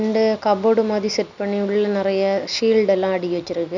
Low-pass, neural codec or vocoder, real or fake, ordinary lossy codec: 7.2 kHz; none; real; none